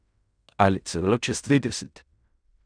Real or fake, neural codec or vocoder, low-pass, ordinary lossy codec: fake; codec, 16 kHz in and 24 kHz out, 0.4 kbps, LongCat-Audio-Codec, fine tuned four codebook decoder; 9.9 kHz; none